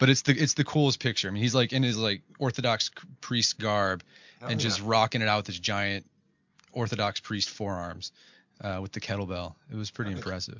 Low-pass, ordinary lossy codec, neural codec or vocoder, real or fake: 7.2 kHz; MP3, 64 kbps; none; real